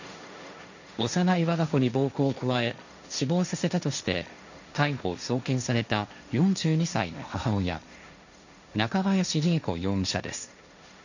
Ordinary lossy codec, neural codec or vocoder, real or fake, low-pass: none; codec, 16 kHz, 1.1 kbps, Voila-Tokenizer; fake; 7.2 kHz